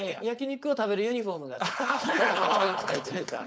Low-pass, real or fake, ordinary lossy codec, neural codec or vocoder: none; fake; none; codec, 16 kHz, 4.8 kbps, FACodec